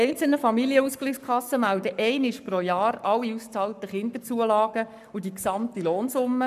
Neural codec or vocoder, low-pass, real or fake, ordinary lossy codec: codec, 44.1 kHz, 7.8 kbps, Pupu-Codec; 14.4 kHz; fake; none